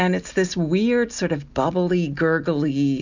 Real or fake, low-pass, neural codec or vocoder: real; 7.2 kHz; none